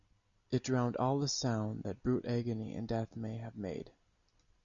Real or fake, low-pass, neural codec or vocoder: real; 7.2 kHz; none